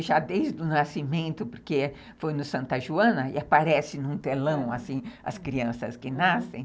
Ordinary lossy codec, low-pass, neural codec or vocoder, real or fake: none; none; none; real